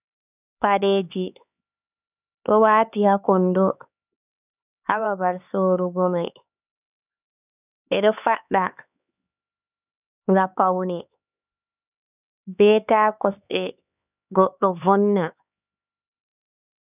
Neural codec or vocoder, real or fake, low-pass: codec, 16 kHz, 4 kbps, X-Codec, HuBERT features, trained on LibriSpeech; fake; 3.6 kHz